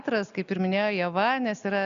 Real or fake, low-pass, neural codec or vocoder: real; 7.2 kHz; none